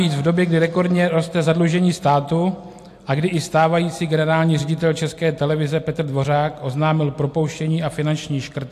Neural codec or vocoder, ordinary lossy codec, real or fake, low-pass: none; AAC, 64 kbps; real; 14.4 kHz